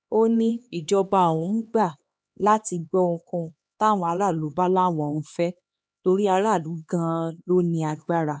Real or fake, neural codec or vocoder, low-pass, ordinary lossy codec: fake; codec, 16 kHz, 2 kbps, X-Codec, HuBERT features, trained on LibriSpeech; none; none